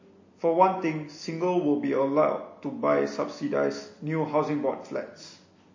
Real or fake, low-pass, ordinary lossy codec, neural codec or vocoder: real; 7.2 kHz; MP3, 32 kbps; none